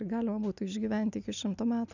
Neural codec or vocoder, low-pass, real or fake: none; 7.2 kHz; real